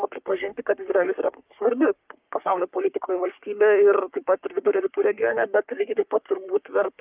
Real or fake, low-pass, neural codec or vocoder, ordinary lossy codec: fake; 3.6 kHz; codec, 44.1 kHz, 3.4 kbps, Pupu-Codec; Opus, 24 kbps